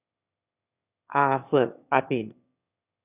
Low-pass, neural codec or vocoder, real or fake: 3.6 kHz; autoencoder, 22.05 kHz, a latent of 192 numbers a frame, VITS, trained on one speaker; fake